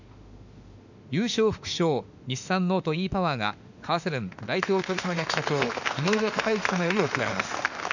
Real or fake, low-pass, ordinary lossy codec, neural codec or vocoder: fake; 7.2 kHz; none; autoencoder, 48 kHz, 32 numbers a frame, DAC-VAE, trained on Japanese speech